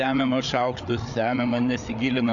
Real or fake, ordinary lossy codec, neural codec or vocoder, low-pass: fake; AAC, 64 kbps; codec, 16 kHz, 8 kbps, FreqCodec, larger model; 7.2 kHz